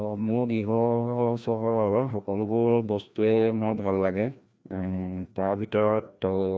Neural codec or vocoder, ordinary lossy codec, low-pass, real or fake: codec, 16 kHz, 1 kbps, FreqCodec, larger model; none; none; fake